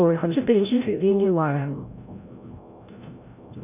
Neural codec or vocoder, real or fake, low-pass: codec, 16 kHz, 0.5 kbps, FreqCodec, larger model; fake; 3.6 kHz